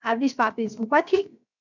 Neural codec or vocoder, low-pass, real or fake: codec, 16 kHz, 0.7 kbps, FocalCodec; 7.2 kHz; fake